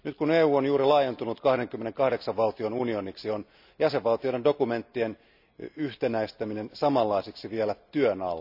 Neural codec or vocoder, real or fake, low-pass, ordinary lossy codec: none; real; 5.4 kHz; none